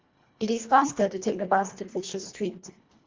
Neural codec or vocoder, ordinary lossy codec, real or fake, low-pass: codec, 24 kHz, 1.5 kbps, HILCodec; Opus, 32 kbps; fake; 7.2 kHz